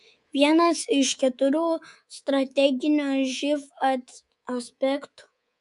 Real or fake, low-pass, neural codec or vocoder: fake; 10.8 kHz; codec, 24 kHz, 3.1 kbps, DualCodec